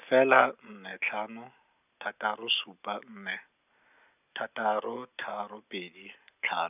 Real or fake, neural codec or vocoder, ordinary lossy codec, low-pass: real; none; none; 3.6 kHz